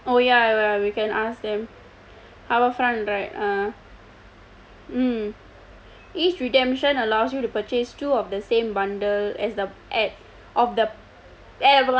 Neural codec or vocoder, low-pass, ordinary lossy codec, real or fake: none; none; none; real